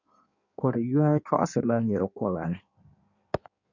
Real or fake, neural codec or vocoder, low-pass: fake; codec, 16 kHz in and 24 kHz out, 1.1 kbps, FireRedTTS-2 codec; 7.2 kHz